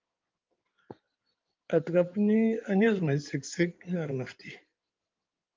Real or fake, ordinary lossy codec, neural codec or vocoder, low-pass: fake; Opus, 24 kbps; codec, 16 kHz in and 24 kHz out, 2.2 kbps, FireRedTTS-2 codec; 7.2 kHz